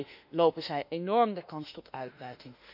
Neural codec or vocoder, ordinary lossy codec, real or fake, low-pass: autoencoder, 48 kHz, 32 numbers a frame, DAC-VAE, trained on Japanese speech; none; fake; 5.4 kHz